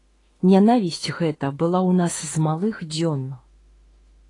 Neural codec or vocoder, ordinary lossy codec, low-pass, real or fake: autoencoder, 48 kHz, 32 numbers a frame, DAC-VAE, trained on Japanese speech; AAC, 32 kbps; 10.8 kHz; fake